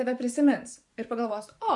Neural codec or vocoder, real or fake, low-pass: vocoder, 24 kHz, 100 mel bands, Vocos; fake; 10.8 kHz